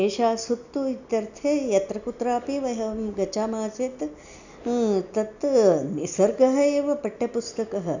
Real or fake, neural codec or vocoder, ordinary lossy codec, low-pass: real; none; none; 7.2 kHz